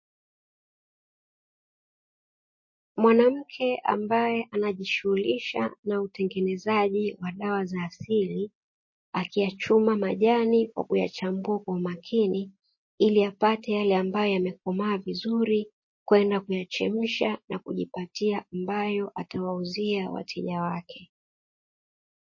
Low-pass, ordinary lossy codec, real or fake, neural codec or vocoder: 7.2 kHz; MP3, 32 kbps; real; none